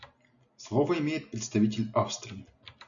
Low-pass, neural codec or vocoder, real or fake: 7.2 kHz; none; real